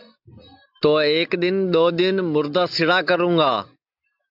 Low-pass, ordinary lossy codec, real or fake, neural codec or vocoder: 5.4 kHz; AAC, 48 kbps; real; none